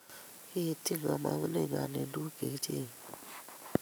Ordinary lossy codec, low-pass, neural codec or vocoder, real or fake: none; none; vocoder, 44.1 kHz, 128 mel bands every 512 samples, BigVGAN v2; fake